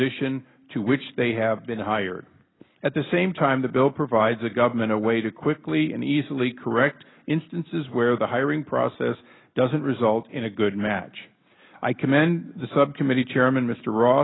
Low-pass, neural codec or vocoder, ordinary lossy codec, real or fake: 7.2 kHz; none; AAC, 16 kbps; real